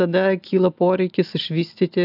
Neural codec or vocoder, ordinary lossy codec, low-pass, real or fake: none; AAC, 48 kbps; 5.4 kHz; real